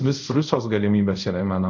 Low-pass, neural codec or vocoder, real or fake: 7.2 kHz; codec, 24 kHz, 0.5 kbps, DualCodec; fake